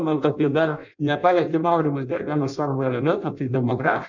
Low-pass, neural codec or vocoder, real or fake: 7.2 kHz; codec, 16 kHz in and 24 kHz out, 0.6 kbps, FireRedTTS-2 codec; fake